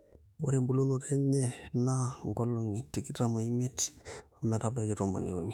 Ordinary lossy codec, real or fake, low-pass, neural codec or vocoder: none; fake; 19.8 kHz; autoencoder, 48 kHz, 32 numbers a frame, DAC-VAE, trained on Japanese speech